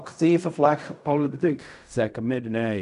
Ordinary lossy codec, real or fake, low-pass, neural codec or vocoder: none; fake; 10.8 kHz; codec, 16 kHz in and 24 kHz out, 0.4 kbps, LongCat-Audio-Codec, fine tuned four codebook decoder